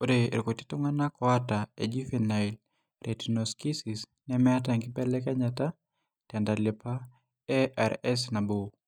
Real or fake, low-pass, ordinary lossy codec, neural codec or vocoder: real; 19.8 kHz; none; none